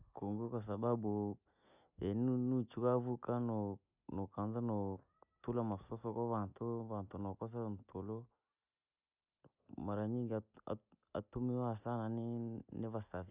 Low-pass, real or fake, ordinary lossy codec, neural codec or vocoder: 3.6 kHz; real; none; none